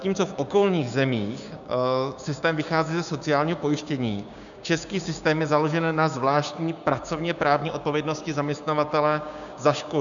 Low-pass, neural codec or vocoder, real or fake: 7.2 kHz; codec, 16 kHz, 6 kbps, DAC; fake